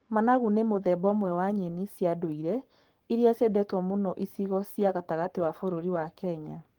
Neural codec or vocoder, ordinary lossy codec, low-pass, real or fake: codec, 44.1 kHz, 7.8 kbps, Pupu-Codec; Opus, 24 kbps; 19.8 kHz; fake